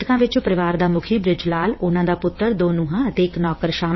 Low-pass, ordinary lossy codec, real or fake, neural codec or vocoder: 7.2 kHz; MP3, 24 kbps; fake; vocoder, 22.05 kHz, 80 mel bands, WaveNeXt